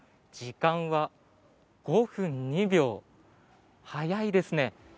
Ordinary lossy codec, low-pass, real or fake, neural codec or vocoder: none; none; real; none